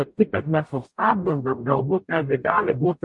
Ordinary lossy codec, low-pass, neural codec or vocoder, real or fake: MP3, 48 kbps; 10.8 kHz; codec, 44.1 kHz, 0.9 kbps, DAC; fake